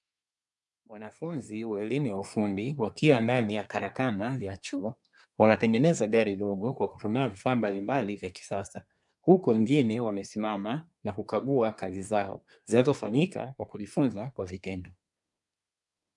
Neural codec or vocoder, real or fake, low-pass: codec, 24 kHz, 1 kbps, SNAC; fake; 10.8 kHz